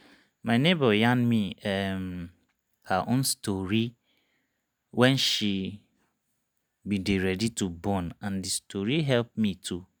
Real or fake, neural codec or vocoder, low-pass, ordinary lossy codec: real; none; none; none